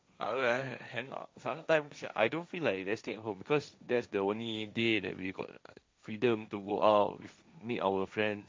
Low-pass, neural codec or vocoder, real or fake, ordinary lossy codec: none; codec, 16 kHz, 1.1 kbps, Voila-Tokenizer; fake; none